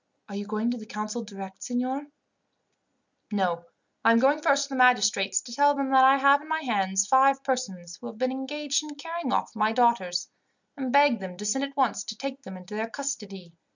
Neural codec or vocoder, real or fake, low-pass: none; real; 7.2 kHz